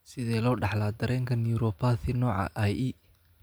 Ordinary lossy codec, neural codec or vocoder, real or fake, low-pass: none; none; real; none